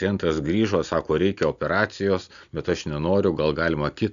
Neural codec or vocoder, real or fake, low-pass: none; real; 7.2 kHz